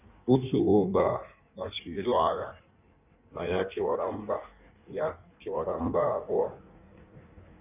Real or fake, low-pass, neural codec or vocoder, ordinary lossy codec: fake; 3.6 kHz; codec, 16 kHz in and 24 kHz out, 1.1 kbps, FireRedTTS-2 codec; none